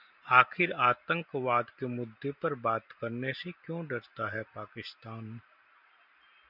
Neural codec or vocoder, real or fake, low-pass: none; real; 5.4 kHz